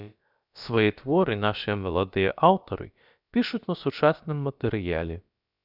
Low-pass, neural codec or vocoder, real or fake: 5.4 kHz; codec, 16 kHz, about 1 kbps, DyCAST, with the encoder's durations; fake